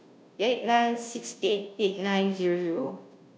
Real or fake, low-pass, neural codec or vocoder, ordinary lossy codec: fake; none; codec, 16 kHz, 0.5 kbps, FunCodec, trained on Chinese and English, 25 frames a second; none